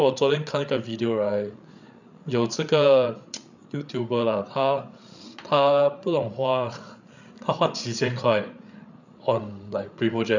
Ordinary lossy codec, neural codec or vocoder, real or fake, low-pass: none; codec, 16 kHz, 8 kbps, FreqCodec, larger model; fake; 7.2 kHz